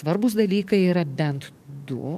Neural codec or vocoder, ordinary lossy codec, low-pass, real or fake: codec, 44.1 kHz, 7.8 kbps, DAC; MP3, 96 kbps; 14.4 kHz; fake